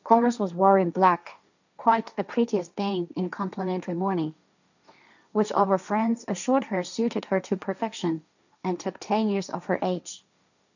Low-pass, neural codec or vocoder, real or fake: 7.2 kHz; codec, 16 kHz, 1.1 kbps, Voila-Tokenizer; fake